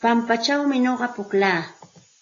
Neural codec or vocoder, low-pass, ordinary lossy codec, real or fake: none; 7.2 kHz; AAC, 32 kbps; real